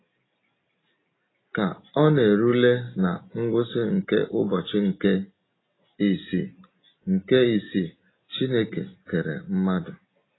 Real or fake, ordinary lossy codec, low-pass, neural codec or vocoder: real; AAC, 16 kbps; 7.2 kHz; none